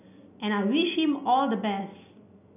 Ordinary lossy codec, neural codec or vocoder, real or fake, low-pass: none; none; real; 3.6 kHz